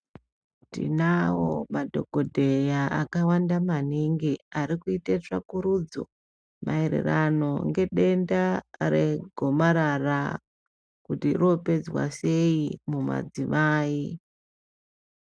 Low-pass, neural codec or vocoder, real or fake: 9.9 kHz; none; real